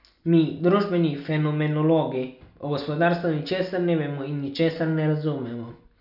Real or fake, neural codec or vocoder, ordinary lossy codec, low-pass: real; none; none; 5.4 kHz